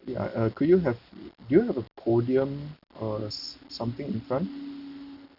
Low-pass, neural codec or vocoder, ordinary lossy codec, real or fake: 5.4 kHz; none; none; real